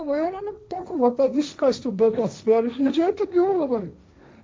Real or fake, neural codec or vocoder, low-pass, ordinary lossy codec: fake; codec, 16 kHz, 1.1 kbps, Voila-Tokenizer; none; none